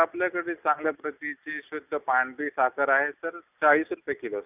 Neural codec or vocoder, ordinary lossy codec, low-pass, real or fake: none; none; 3.6 kHz; real